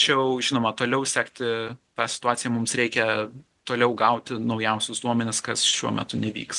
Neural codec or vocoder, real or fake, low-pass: none; real; 10.8 kHz